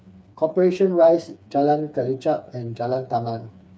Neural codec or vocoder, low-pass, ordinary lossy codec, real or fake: codec, 16 kHz, 4 kbps, FreqCodec, smaller model; none; none; fake